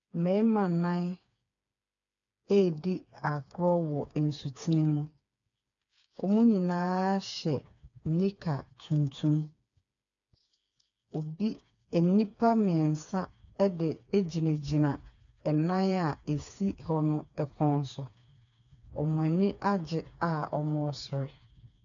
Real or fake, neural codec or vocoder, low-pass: fake; codec, 16 kHz, 4 kbps, FreqCodec, smaller model; 7.2 kHz